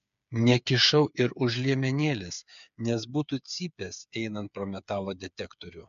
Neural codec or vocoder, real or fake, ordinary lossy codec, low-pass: codec, 16 kHz, 8 kbps, FreqCodec, smaller model; fake; MP3, 64 kbps; 7.2 kHz